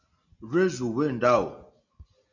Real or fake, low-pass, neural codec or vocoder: real; 7.2 kHz; none